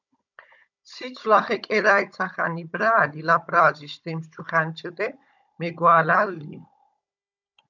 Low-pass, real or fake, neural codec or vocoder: 7.2 kHz; fake; codec, 16 kHz, 16 kbps, FunCodec, trained on Chinese and English, 50 frames a second